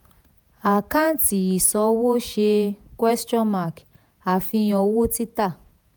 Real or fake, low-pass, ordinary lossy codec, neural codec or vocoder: fake; none; none; vocoder, 48 kHz, 128 mel bands, Vocos